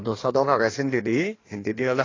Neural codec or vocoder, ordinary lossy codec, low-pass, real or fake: codec, 16 kHz in and 24 kHz out, 1.1 kbps, FireRedTTS-2 codec; AAC, 32 kbps; 7.2 kHz; fake